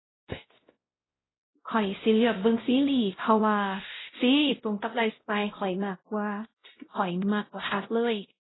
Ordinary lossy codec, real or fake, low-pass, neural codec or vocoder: AAC, 16 kbps; fake; 7.2 kHz; codec, 16 kHz, 0.5 kbps, X-Codec, WavLM features, trained on Multilingual LibriSpeech